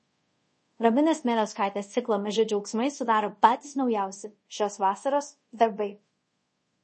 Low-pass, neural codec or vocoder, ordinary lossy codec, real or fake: 10.8 kHz; codec, 24 kHz, 0.5 kbps, DualCodec; MP3, 32 kbps; fake